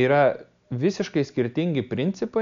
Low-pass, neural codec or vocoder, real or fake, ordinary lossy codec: 7.2 kHz; none; real; MP3, 64 kbps